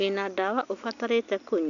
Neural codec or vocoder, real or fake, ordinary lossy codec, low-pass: none; real; none; 7.2 kHz